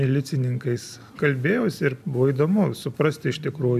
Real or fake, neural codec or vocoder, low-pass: real; none; 14.4 kHz